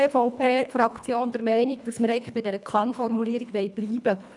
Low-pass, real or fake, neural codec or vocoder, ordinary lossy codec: 10.8 kHz; fake; codec, 24 kHz, 1.5 kbps, HILCodec; none